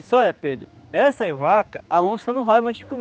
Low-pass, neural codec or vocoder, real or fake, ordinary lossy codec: none; codec, 16 kHz, 2 kbps, X-Codec, HuBERT features, trained on general audio; fake; none